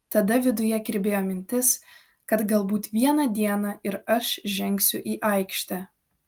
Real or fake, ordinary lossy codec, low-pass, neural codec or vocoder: real; Opus, 32 kbps; 19.8 kHz; none